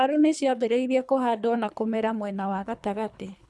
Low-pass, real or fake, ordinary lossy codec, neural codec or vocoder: none; fake; none; codec, 24 kHz, 3 kbps, HILCodec